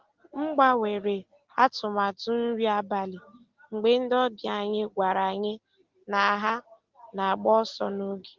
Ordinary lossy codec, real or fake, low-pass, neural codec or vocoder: Opus, 16 kbps; real; 7.2 kHz; none